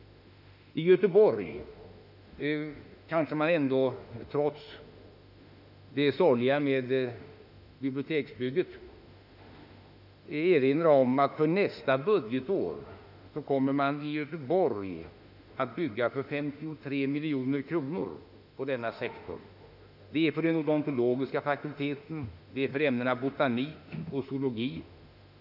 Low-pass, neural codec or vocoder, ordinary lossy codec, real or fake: 5.4 kHz; autoencoder, 48 kHz, 32 numbers a frame, DAC-VAE, trained on Japanese speech; none; fake